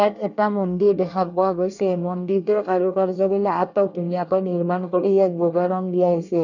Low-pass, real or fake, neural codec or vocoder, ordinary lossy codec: 7.2 kHz; fake; codec, 24 kHz, 1 kbps, SNAC; none